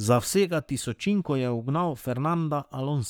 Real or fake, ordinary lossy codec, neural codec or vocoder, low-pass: fake; none; codec, 44.1 kHz, 7.8 kbps, Pupu-Codec; none